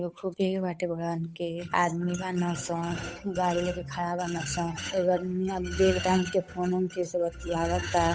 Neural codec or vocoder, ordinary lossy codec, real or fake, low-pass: codec, 16 kHz, 8 kbps, FunCodec, trained on Chinese and English, 25 frames a second; none; fake; none